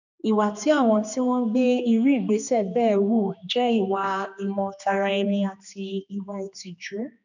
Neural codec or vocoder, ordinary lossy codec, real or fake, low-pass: codec, 16 kHz, 2 kbps, X-Codec, HuBERT features, trained on general audio; none; fake; 7.2 kHz